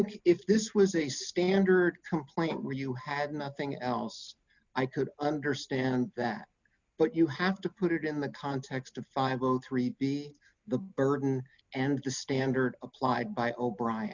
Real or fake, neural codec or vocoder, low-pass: real; none; 7.2 kHz